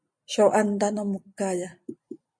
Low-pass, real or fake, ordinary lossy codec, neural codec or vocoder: 9.9 kHz; real; MP3, 48 kbps; none